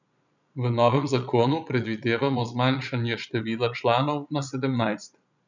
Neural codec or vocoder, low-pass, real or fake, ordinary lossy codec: vocoder, 22.05 kHz, 80 mel bands, Vocos; 7.2 kHz; fake; none